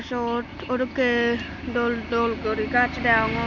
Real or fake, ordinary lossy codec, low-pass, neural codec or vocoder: real; none; 7.2 kHz; none